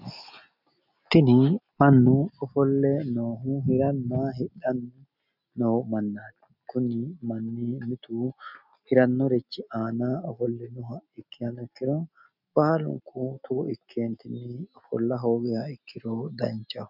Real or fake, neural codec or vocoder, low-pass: real; none; 5.4 kHz